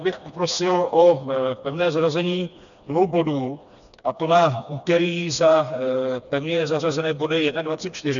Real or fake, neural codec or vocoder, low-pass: fake; codec, 16 kHz, 2 kbps, FreqCodec, smaller model; 7.2 kHz